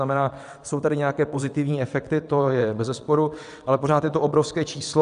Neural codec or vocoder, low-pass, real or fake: vocoder, 22.05 kHz, 80 mel bands, WaveNeXt; 9.9 kHz; fake